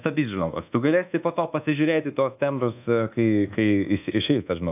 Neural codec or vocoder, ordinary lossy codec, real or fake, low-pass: codec, 24 kHz, 1.2 kbps, DualCodec; AAC, 32 kbps; fake; 3.6 kHz